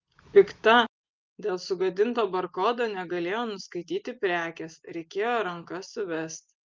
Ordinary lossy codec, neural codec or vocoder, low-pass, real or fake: Opus, 32 kbps; none; 7.2 kHz; real